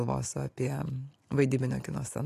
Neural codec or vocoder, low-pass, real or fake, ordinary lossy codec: none; 14.4 kHz; real; AAC, 48 kbps